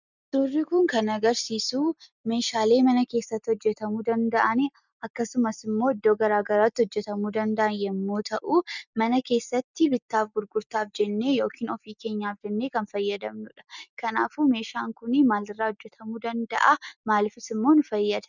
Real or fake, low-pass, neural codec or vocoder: real; 7.2 kHz; none